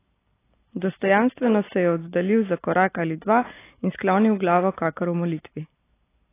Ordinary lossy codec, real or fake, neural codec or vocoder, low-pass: AAC, 24 kbps; real; none; 3.6 kHz